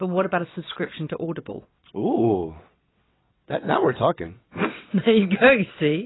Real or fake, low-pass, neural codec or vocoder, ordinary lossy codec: fake; 7.2 kHz; vocoder, 22.05 kHz, 80 mel bands, WaveNeXt; AAC, 16 kbps